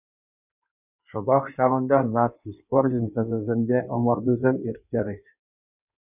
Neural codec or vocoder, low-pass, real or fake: codec, 16 kHz in and 24 kHz out, 1.1 kbps, FireRedTTS-2 codec; 3.6 kHz; fake